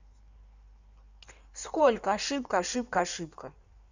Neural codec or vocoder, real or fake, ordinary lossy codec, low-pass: codec, 16 kHz in and 24 kHz out, 1.1 kbps, FireRedTTS-2 codec; fake; none; 7.2 kHz